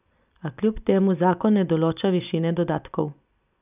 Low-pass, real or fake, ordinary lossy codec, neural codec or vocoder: 3.6 kHz; real; none; none